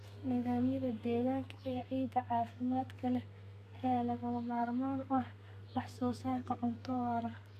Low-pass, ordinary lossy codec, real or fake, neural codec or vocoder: 14.4 kHz; none; fake; codec, 32 kHz, 1.9 kbps, SNAC